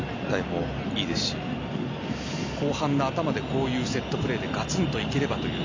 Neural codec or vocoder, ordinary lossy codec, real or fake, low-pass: none; MP3, 64 kbps; real; 7.2 kHz